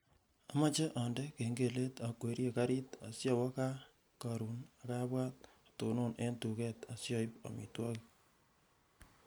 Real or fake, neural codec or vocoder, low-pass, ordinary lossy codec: real; none; none; none